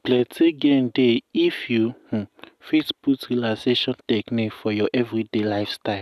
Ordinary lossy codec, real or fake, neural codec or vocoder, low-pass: none; real; none; 14.4 kHz